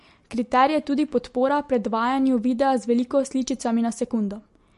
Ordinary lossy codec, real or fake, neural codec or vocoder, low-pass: MP3, 48 kbps; real; none; 14.4 kHz